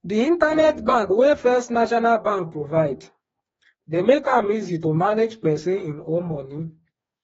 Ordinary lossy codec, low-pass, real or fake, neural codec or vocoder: AAC, 24 kbps; 19.8 kHz; fake; codec, 44.1 kHz, 2.6 kbps, DAC